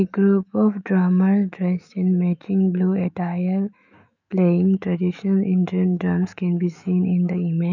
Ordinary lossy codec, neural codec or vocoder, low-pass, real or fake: none; autoencoder, 48 kHz, 128 numbers a frame, DAC-VAE, trained on Japanese speech; 7.2 kHz; fake